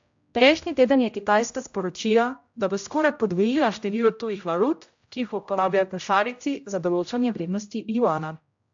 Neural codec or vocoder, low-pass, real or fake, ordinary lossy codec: codec, 16 kHz, 0.5 kbps, X-Codec, HuBERT features, trained on general audio; 7.2 kHz; fake; AAC, 64 kbps